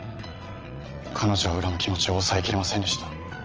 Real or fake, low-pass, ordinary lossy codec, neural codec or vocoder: fake; 7.2 kHz; Opus, 24 kbps; vocoder, 22.05 kHz, 80 mel bands, Vocos